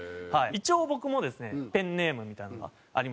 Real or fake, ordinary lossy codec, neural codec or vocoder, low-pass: real; none; none; none